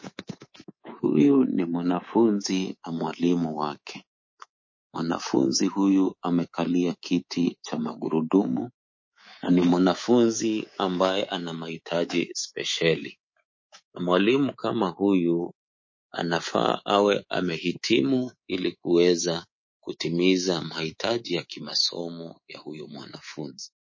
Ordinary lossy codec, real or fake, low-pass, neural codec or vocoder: MP3, 32 kbps; fake; 7.2 kHz; codec, 24 kHz, 3.1 kbps, DualCodec